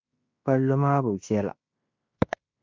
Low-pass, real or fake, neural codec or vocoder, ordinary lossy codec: 7.2 kHz; fake; codec, 16 kHz in and 24 kHz out, 0.9 kbps, LongCat-Audio-Codec, fine tuned four codebook decoder; MP3, 48 kbps